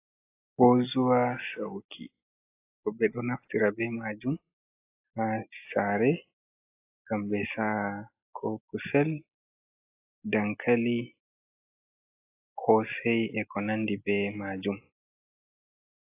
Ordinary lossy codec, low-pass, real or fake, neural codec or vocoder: AAC, 24 kbps; 3.6 kHz; real; none